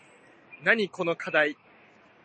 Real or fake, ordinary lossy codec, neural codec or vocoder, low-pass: real; MP3, 32 kbps; none; 9.9 kHz